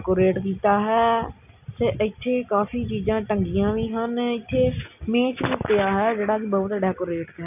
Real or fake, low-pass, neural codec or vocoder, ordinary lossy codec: real; 3.6 kHz; none; none